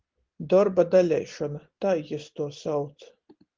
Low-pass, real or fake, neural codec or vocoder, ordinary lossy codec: 7.2 kHz; real; none; Opus, 16 kbps